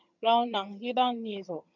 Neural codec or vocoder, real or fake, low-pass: vocoder, 22.05 kHz, 80 mel bands, HiFi-GAN; fake; 7.2 kHz